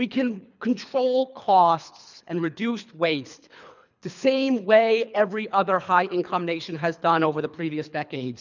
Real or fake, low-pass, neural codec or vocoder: fake; 7.2 kHz; codec, 24 kHz, 3 kbps, HILCodec